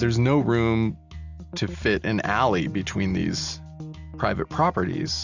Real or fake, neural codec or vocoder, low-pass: real; none; 7.2 kHz